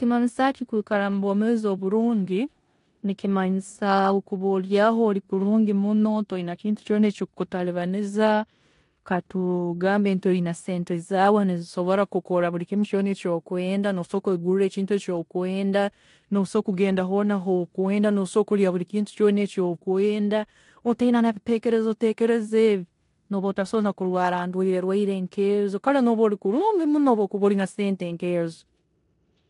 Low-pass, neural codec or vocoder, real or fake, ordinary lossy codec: 10.8 kHz; codec, 16 kHz in and 24 kHz out, 0.9 kbps, LongCat-Audio-Codec, four codebook decoder; fake; AAC, 48 kbps